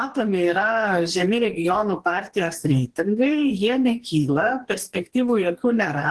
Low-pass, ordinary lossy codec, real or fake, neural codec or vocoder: 10.8 kHz; Opus, 16 kbps; fake; codec, 44.1 kHz, 2.6 kbps, DAC